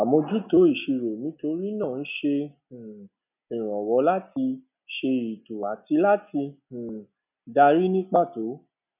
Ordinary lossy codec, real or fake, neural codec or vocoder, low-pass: none; real; none; 3.6 kHz